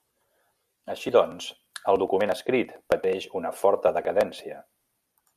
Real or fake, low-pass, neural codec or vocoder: real; 14.4 kHz; none